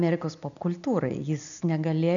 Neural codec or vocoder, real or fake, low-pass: none; real; 7.2 kHz